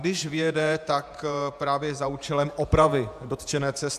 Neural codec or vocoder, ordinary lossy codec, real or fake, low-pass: vocoder, 48 kHz, 128 mel bands, Vocos; AAC, 96 kbps; fake; 14.4 kHz